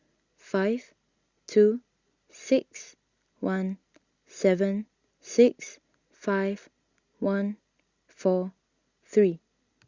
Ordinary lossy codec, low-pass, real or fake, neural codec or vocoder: Opus, 64 kbps; 7.2 kHz; real; none